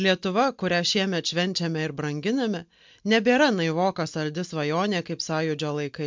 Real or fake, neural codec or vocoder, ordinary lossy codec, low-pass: real; none; MP3, 64 kbps; 7.2 kHz